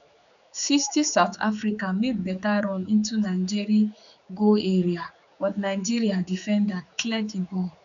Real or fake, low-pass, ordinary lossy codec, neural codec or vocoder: fake; 7.2 kHz; none; codec, 16 kHz, 4 kbps, X-Codec, HuBERT features, trained on general audio